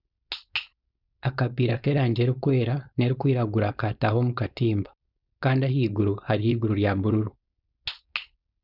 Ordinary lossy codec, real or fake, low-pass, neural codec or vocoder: none; fake; 5.4 kHz; codec, 16 kHz, 4.8 kbps, FACodec